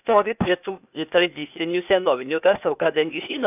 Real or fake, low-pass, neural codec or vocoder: fake; 3.6 kHz; codec, 16 kHz, 0.8 kbps, ZipCodec